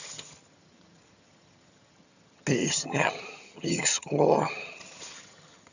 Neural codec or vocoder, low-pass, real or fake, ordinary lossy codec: vocoder, 22.05 kHz, 80 mel bands, HiFi-GAN; 7.2 kHz; fake; none